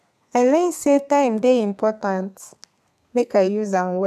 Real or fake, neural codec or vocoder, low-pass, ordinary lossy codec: fake; codec, 32 kHz, 1.9 kbps, SNAC; 14.4 kHz; none